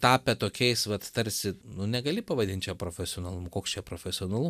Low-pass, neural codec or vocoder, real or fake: 14.4 kHz; none; real